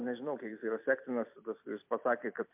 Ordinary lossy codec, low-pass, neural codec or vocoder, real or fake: MP3, 32 kbps; 3.6 kHz; vocoder, 44.1 kHz, 128 mel bands every 256 samples, BigVGAN v2; fake